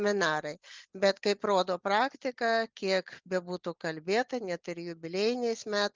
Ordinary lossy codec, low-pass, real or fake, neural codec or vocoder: Opus, 32 kbps; 7.2 kHz; real; none